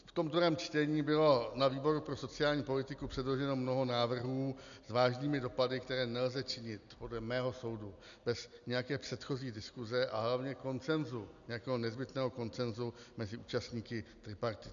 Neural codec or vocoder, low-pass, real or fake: none; 7.2 kHz; real